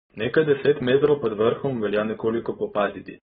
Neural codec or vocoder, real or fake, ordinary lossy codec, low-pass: codec, 16 kHz, 4.8 kbps, FACodec; fake; AAC, 16 kbps; 7.2 kHz